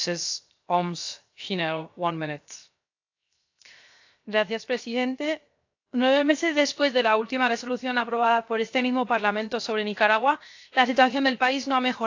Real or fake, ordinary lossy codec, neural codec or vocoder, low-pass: fake; AAC, 48 kbps; codec, 16 kHz, 0.7 kbps, FocalCodec; 7.2 kHz